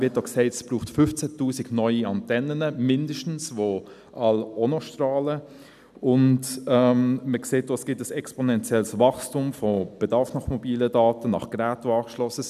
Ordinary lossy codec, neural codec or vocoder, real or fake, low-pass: none; none; real; 14.4 kHz